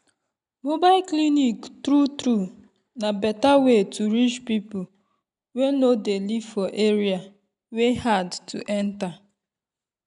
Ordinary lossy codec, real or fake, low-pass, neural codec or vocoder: none; real; 10.8 kHz; none